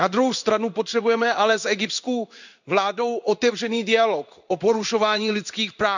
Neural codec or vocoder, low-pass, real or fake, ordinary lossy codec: codec, 16 kHz in and 24 kHz out, 1 kbps, XY-Tokenizer; 7.2 kHz; fake; none